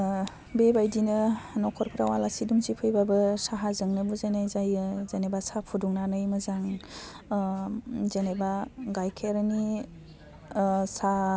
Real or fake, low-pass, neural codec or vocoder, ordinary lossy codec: real; none; none; none